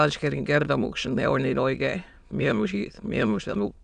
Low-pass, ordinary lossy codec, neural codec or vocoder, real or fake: 9.9 kHz; none; autoencoder, 22.05 kHz, a latent of 192 numbers a frame, VITS, trained on many speakers; fake